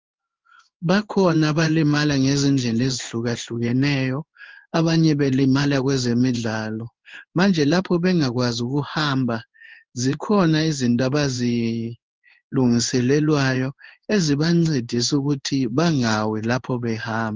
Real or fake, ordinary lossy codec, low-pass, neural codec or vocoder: fake; Opus, 32 kbps; 7.2 kHz; codec, 16 kHz in and 24 kHz out, 1 kbps, XY-Tokenizer